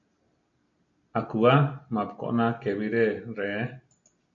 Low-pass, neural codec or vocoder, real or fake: 7.2 kHz; none; real